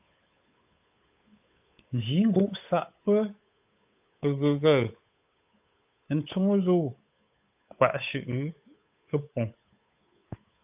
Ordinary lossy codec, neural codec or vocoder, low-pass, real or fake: AAC, 32 kbps; codec, 16 kHz, 8 kbps, FunCodec, trained on Chinese and English, 25 frames a second; 3.6 kHz; fake